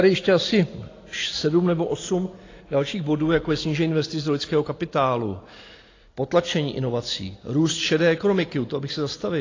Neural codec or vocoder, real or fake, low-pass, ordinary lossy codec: none; real; 7.2 kHz; AAC, 32 kbps